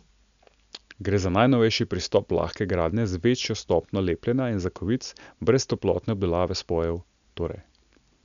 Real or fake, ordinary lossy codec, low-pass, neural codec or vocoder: real; none; 7.2 kHz; none